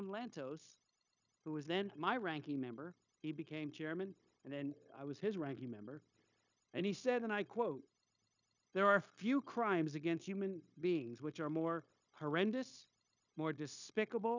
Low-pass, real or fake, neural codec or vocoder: 7.2 kHz; fake; codec, 16 kHz, 0.9 kbps, LongCat-Audio-Codec